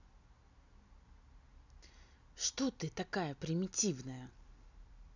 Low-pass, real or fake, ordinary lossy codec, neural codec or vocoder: 7.2 kHz; real; none; none